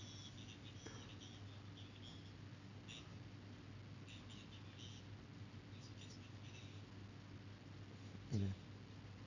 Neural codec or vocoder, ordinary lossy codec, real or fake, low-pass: none; none; real; 7.2 kHz